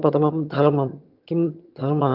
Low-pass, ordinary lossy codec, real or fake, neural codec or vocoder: 5.4 kHz; Opus, 32 kbps; fake; vocoder, 22.05 kHz, 80 mel bands, HiFi-GAN